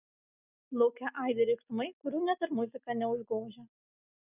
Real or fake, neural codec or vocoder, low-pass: real; none; 3.6 kHz